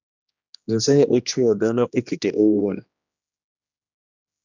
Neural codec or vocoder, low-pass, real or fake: codec, 16 kHz, 1 kbps, X-Codec, HuBERT features, trained on general audio; 7.2 kHz; fake